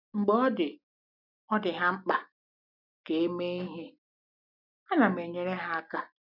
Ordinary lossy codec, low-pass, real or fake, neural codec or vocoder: none; 5.4 kHz; real; none